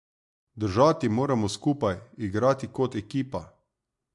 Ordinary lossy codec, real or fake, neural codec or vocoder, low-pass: MP3, 64 kbps; real; none; 10.8 kHz